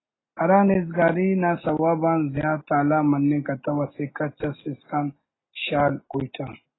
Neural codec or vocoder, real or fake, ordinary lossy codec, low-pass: none; real; AAC, 16 kbps; 7.2 kHz